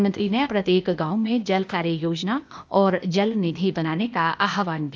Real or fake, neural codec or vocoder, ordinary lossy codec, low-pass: fake; codec, 16 kHz, 0.8 kbps, ZipCodec; none; none